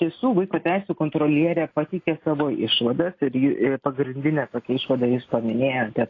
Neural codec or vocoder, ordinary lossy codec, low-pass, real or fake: none; AAC, 32 kbps; 7.2 kHz; real